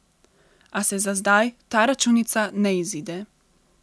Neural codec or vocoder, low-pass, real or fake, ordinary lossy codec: none; none; real; none